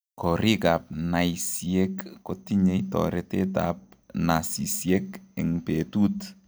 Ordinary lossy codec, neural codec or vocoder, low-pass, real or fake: none; none; none; real